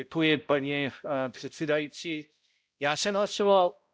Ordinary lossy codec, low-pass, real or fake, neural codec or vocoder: none; none; fake; codec, 16 kHz, 0.5 kbps, X-Codec, HuBERT features, trained on balanced general audio